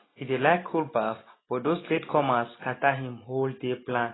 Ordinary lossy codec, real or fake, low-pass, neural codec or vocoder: AAC, 16 kbps; real; 7.2 kHz; none